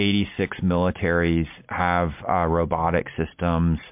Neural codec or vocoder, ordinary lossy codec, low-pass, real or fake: none; MP3, 32 kbps; 3.6 kHz; real